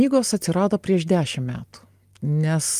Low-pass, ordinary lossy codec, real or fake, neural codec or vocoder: 14.4 kHz; Opus, 32 kbps; real; none